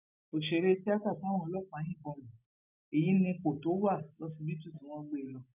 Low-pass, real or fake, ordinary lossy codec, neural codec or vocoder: 3.6 kHz; real; none; none